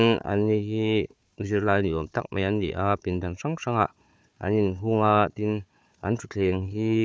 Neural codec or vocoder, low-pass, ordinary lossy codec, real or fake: codec, 16 kHz, 4 kbps, FunCodec, trained on Chinese and English, 50 frames a second; none; none; fake